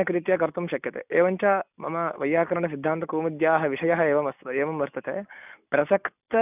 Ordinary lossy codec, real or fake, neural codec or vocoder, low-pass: none; real; none; 3.6 kHz